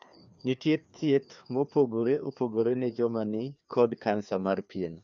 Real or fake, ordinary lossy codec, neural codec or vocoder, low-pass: fake; none; codec, 16 kHz, 2 kbps, FreqCodec, larger model; 7.2 kHz